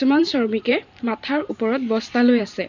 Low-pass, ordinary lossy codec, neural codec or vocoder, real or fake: 7.2 kHz; none; vocoder, 44.1 kHz, 128 mel bands every 512 samples, BigVGAN v2; fake